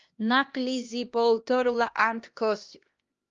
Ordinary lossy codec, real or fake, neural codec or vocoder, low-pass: Opus, 32 kbps; fake; codec, 16 kHz, 1 kbps, X-Codec, HuBERT features, trained on LibriSpeech; 7.2 kHz